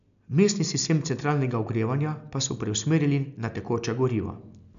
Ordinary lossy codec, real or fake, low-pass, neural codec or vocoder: none; real; 7.2 kHz; none